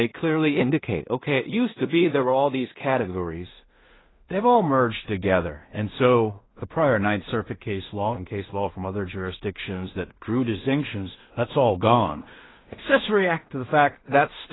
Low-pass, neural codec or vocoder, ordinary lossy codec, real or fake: 7.2 kHz; codec, 16 kHz in and 24 kHz out, 0.4 kbps, LongCat-Audio-Codec, two codebook decoder; AAC, 16 kbps; fake